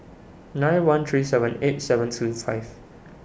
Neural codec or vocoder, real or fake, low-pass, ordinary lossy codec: none; real; none; none